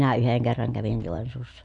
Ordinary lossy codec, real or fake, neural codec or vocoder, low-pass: none; real; none; 10.8 kHz